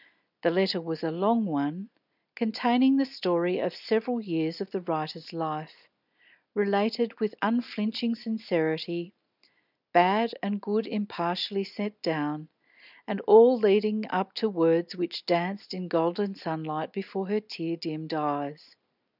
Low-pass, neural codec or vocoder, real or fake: 5.4 kHz; none; real